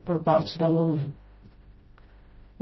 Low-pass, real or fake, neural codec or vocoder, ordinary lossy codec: 7.2 kHz; fake; codec, 16 kHz, 0.5 kbps, FreqCodec, smaller model; MP3, 24 kbps